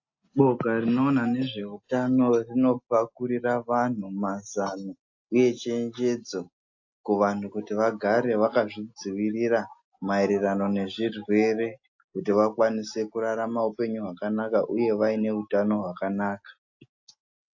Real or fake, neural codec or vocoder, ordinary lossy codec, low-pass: real; none; AAC, 48 kbps; 7.2 kHz